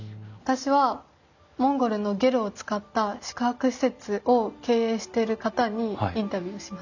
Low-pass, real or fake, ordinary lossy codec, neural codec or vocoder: 7.2 kHz; real; none; none